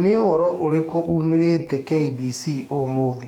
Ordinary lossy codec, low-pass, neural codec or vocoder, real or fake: none; 19.8 kHz; codec, 44.1 kHz, 2.6 kbps, DAC; fake